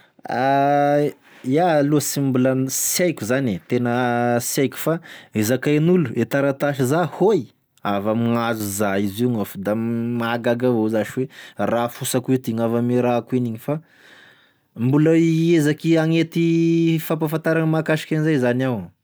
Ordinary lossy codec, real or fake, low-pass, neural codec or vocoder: none; real; none; none